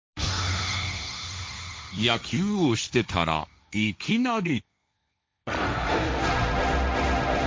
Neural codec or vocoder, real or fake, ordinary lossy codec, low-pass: codec, 16 kHz, 1.1 kbps, Voila-Tokenizer; fake; none; none